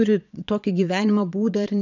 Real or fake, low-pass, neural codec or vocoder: fake; 7.2 kHz; vocoder, 22.05 kHz, 80 mel bands, Vocos